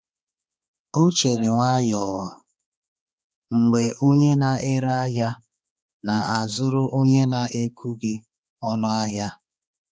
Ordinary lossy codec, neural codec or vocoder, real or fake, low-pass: none; codec, 16 kHz, 2 kbps, X-Codec, HuBERT features, trained on balanced general audio; fake; none